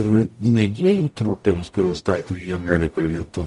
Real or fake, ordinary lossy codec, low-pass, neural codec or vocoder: fake; MP3, 48 kbps; 14.4 kHz; codec, 44.1 kHz, 0.9 kbps, DAC